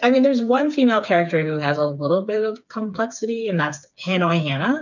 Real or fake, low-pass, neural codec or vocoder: fake; 7.2 kHz; codec, 16 kHz, 4 kbps, FreqCodec, smaller model